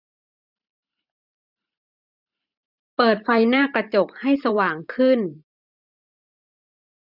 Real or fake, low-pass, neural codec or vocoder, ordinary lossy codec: fake; 5.4 kHz; vocoder, 44.1 kHz, 128 mel bands every 512 samples, BigVGAN v2; none